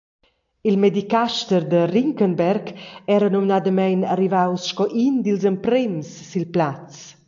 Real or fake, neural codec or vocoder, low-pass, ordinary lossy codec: real; none; 7.2 kHz; AAC, 64 kbps